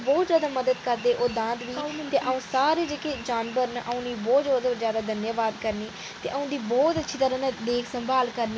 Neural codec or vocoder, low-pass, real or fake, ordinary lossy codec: none; none; real; none